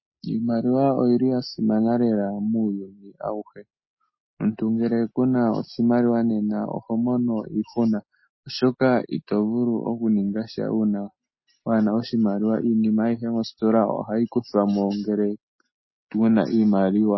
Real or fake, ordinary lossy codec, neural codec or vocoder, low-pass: real; MP3, 24 kbps; none; 7.2 kHz